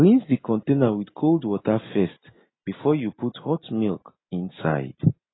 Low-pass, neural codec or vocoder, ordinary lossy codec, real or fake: 7.2 kHz; none; AAC, 16 kbps; real